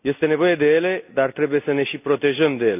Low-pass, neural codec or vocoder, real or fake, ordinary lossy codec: 3.6 kHz; none; real; none